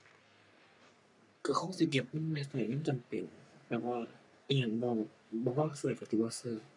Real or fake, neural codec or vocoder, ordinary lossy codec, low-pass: fake; codec, 44.1 kHz, 3.4 kbps, Pupu-Codec; none; 10.8 kHz